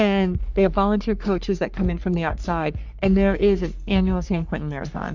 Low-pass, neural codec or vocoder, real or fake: 7.2 kHz; codec, 44.1 kHz, 3.4 kbps, Pupu-Codec; fake